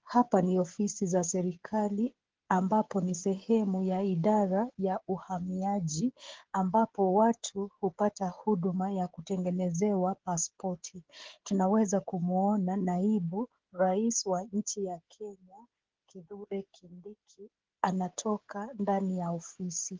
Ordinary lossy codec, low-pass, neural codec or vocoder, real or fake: Opus, 16 kbps; 7.2 kHz; codec, 16 kHz, 16 kbps, FreqCodec, smaller model; fake